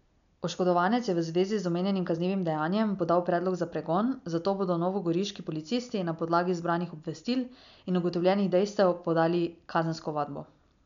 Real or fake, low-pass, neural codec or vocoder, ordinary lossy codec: real; 7.2 kHz; none; none